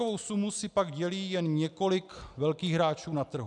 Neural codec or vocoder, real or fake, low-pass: none; real; 10.8 kHz